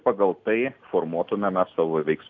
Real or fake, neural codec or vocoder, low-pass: real; none; 7.2 kHz